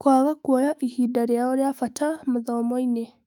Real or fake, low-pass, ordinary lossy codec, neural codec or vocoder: fake; 19.8 kHz; none; autoencoder, 48 kHz, 128 numbers a frame, DAC-VAE, trained on Japanese speech